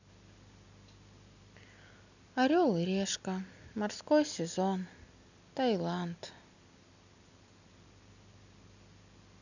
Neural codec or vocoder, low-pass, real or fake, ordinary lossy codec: none; 7.2 kHz; real; none